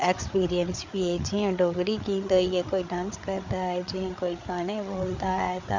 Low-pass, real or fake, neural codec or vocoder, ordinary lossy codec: 7.2 kHz; fake; codec, 16 kHz, 8 kbps, FreqCodec, larger model; MP3, 64 kbps